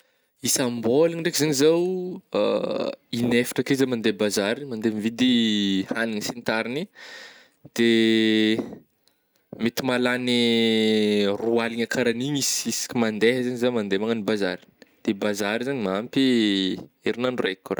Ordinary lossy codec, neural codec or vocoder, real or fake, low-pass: none; none; real; none